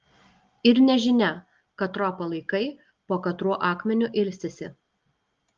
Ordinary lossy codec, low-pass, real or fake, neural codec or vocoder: Opus, 32 kbps; 7.2 kHz; real; none